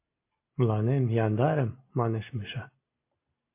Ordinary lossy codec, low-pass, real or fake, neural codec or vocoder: MP3, 24 kbps; 3.6 kHz; real; none